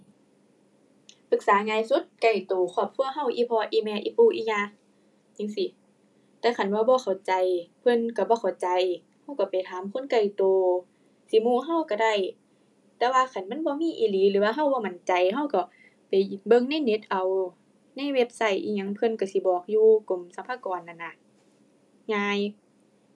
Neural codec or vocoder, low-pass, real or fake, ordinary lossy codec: none; none; real; none